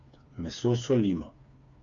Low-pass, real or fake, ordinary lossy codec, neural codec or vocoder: 7.2 kHz; fake; none; codec, 16 kHz, 4 kbps, FreqCodec, smaller model